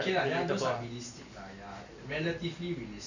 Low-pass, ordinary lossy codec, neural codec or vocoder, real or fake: 7.2 kHz; none; none; real